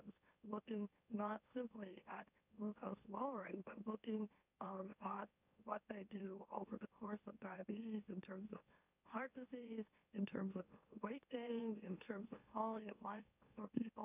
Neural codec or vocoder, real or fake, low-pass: autoencoder, 44.1 kHz, a latent of 192 numbers a frame, MeloTTS; fake; 3.6 kHz